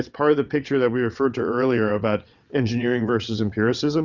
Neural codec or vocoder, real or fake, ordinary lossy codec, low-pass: vocoder, 22.05 kHz, 80 mel bands, WaveNeXt; fake; Opus, 64 kbps; 7.2 kHz